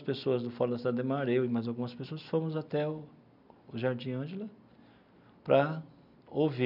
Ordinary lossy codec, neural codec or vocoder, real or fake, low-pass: none; none; real; 5.4 kHz